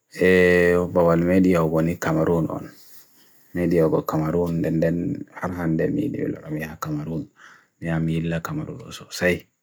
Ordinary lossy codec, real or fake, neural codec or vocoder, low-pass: none; real; none; none